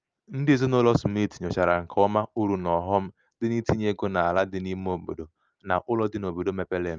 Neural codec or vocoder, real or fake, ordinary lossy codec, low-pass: none; real; Opus, 24 kbps; 7.2 kHz